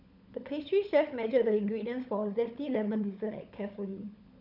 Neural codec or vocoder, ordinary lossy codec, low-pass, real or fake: codec, 16 kHz, 16 kbps, FunCodec, trained on LibriTTS, 50 frames a second; none; 5.4 kHz; fake